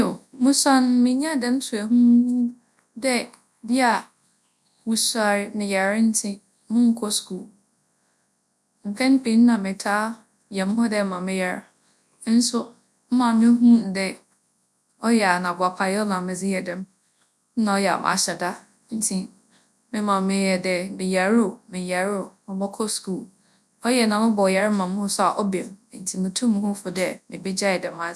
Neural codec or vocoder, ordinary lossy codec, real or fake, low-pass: codec, 24 kHz, 0.9 kbps, WavTokenizer, large speech release; none; fake; none